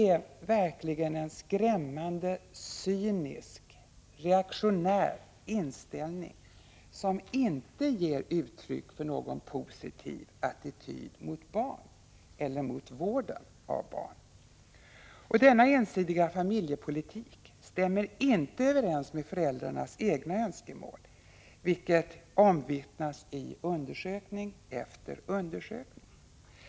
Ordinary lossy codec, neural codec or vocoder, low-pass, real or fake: none; none; none; real